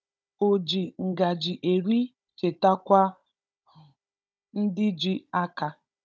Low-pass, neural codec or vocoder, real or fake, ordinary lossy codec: none; codec, 16 kHz, 16 kbps, FunCodec, trained on Chinese and English, 50 frames a second; fake; none